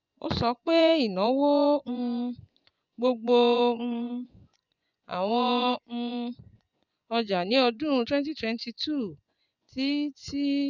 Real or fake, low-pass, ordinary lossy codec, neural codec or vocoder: fake; 7.2 kHz; none; vocoder, 24 kHz, 100 mel bands, Vocos